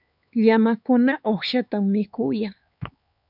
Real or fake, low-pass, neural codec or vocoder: fake; 5.4 kHz; codec, 16 kHz, 4 kbps, X-Codec, HuBERT features, trained on LibriSpeech